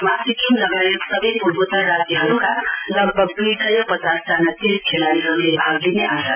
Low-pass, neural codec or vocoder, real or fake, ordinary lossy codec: 3.6 kHz; none; real; none